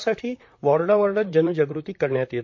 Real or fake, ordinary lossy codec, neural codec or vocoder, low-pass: fake; MP3, 48 kbps; vocoder, 44.1 kHz, 128 mel bands, Pupu-Vocoder; 7.2 kHz